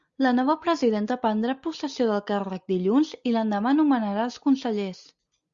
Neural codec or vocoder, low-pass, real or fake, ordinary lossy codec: none; 7.2 kHz; real; Opus, 64 kbps